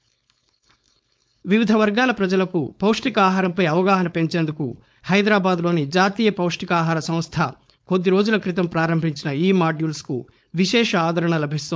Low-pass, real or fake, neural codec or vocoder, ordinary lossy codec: none; fake; codec, 16 kHz, 4.8 kbps, FACodec; none